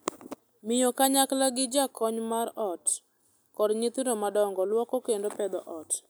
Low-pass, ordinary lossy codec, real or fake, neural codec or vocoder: none; none; real; none